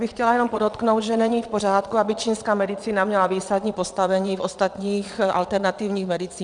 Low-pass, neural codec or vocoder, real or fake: 9.9 kHz; vocoder, 22.05 kHz, 80 mel bands, Vocos; fake